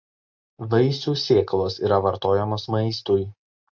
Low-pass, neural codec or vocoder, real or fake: 7.2 kHz; none; real